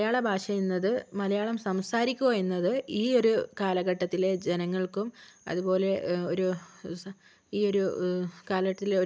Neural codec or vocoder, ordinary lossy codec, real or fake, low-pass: none; none; real; none